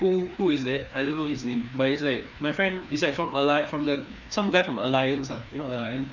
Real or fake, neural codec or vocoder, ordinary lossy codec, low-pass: fake; codec, 16 kHz, 2 kbps, FreqCodec, larger model; none; 7.2 kHz